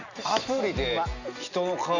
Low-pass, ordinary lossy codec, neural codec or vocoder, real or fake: 7.2 kHz; none; none; real